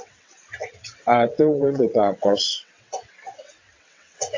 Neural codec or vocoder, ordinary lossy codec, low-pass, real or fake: vocoder, 22.05 kHz, 80 mel bands, WaveNeXt; AAC, 48 kbps; 7.2 kHz; fake